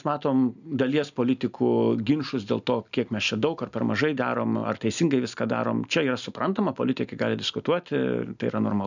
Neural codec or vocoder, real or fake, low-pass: none; real; 7.2 kHz